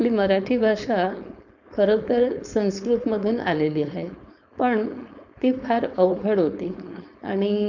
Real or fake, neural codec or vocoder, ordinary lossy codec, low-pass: fake; codec, 16 kHz, 4.8 kbps, FACodec; none; 7.2 kHz